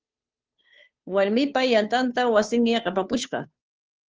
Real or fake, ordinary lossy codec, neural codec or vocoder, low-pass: fake; Opus, 24 kbps; codec, 16 kHz, 2 kbps, FunCodec, trained on Chinese and English, 25 frames a second; 7.2 kHz